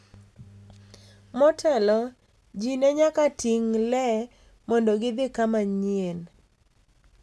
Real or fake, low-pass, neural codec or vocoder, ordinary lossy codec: real; none; none; none